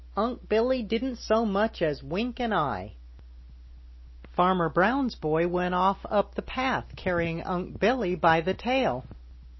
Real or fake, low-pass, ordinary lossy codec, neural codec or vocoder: real; 7.2 kHz; MP3, 24 kbps; none